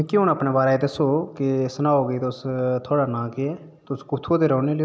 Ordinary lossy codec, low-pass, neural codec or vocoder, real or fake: none; none; none; real